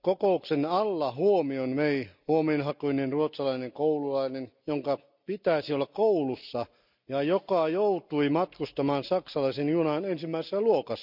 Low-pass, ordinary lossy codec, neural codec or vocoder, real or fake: 5.4 kHz; none; none; real